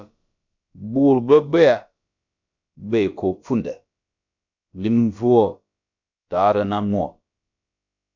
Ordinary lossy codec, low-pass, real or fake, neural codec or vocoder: MP3, 64 kbps; 7.2 kHz; fake; codec, 16 kHz, about 1 kbps, DyCAST, with the encoder's durations